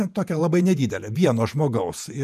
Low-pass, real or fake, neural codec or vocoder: 14.4 kHz; fake; vocoder, 48 kHz, 128 mel bands, Vocos